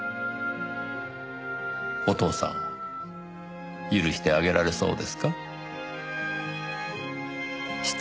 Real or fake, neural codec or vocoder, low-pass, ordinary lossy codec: real; none; none; none